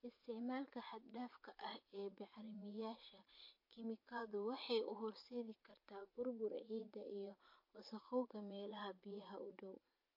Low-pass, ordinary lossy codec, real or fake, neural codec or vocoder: 5.4 kHz; MP3, 32 kbps; fake; vocoder, 44.1 kHz, 80 mel bands, Vocos